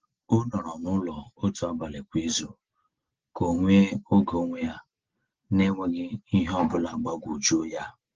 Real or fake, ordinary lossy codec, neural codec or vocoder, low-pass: real; Opus, 16 kbps; none; 7.2 kHz